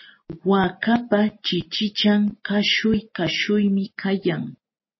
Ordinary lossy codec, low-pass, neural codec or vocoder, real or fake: MP3, 24 kbps; 7.2 kHz; none; real